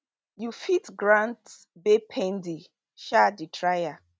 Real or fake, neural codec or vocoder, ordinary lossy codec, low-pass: real; none; none; none